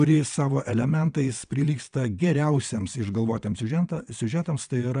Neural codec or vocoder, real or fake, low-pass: vocoder, 22.05 kHz, 80 mel bands, WaveNeXt; fake; 9.9 kHz